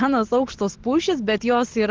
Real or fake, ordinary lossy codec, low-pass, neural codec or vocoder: real; Opus, 16 kbps; 7.2 kHz; none